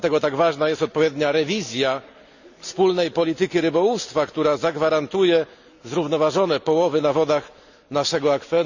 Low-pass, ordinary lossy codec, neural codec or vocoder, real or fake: 7.2 kHz; none; none; real